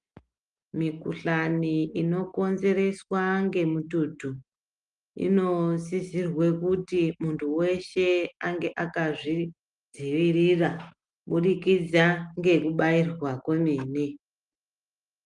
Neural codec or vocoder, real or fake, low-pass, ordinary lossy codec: none; real; 10.8 kHz; Opus, 32 kbps